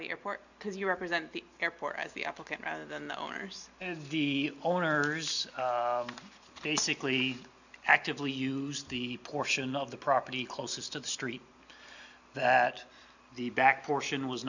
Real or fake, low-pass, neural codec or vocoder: real; 7.2 kHz; none